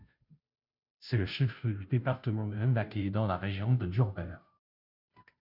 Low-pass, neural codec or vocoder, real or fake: 5.4 kHz; codec, 16 kHz, 0.5 kbps, FunCodec, trained on Chinese and English, 25 frames a second; fake